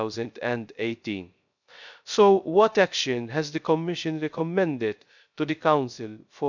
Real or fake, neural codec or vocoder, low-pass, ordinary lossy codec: fake; codec, 16 kHz, 0.3 kbps, FocalCodec; 7.2 kHz; none